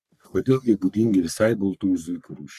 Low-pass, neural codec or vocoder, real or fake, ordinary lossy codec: 14.4 kHz; codec, 44.1 kHz, 3.4 kbps, Pupu-Codec; fake; MP3, 96 kbps